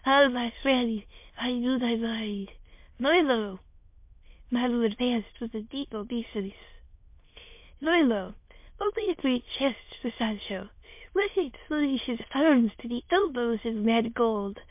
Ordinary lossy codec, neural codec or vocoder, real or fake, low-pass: MP3, 32 kbps; autoencoder, 22.05 kHz, a latent of 192 numbers a frame, VITS, trained on many speakers; fake; 3.6 kHz